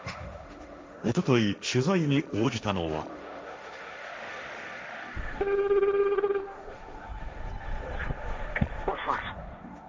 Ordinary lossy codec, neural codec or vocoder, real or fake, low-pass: none; codec, 16 kHz, 1.1 kbps, Voila-Tokenizer; fake; none